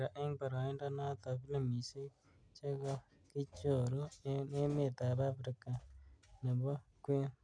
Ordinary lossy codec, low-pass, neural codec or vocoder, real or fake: none; 9.9 kHz; none; real